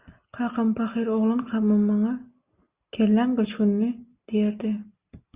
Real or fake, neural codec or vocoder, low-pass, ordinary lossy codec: real; none; 3.6 kHz; Opus, 64 kbps